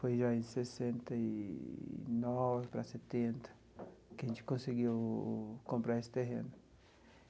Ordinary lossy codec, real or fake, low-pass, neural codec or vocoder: none; real; none; none